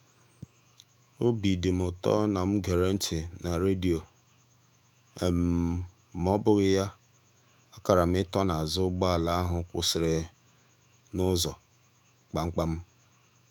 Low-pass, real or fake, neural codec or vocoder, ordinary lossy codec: 19.8 kHz; fake; autoencoder, 48 kHz, 128 numbers a frame, DAC-VAE, trained on Japanese speech; none